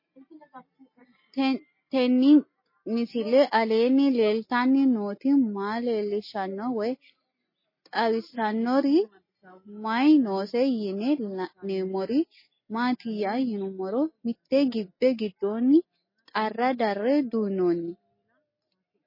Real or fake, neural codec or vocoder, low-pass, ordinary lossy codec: real; none; 5.4 kHz; MP3, 24 kbps